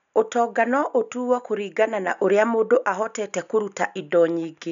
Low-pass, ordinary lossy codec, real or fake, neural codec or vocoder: 7.2 kHz; none; real; none